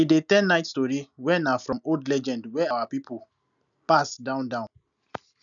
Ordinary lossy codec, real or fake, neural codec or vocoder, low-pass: none; real; none; 7.2 kHz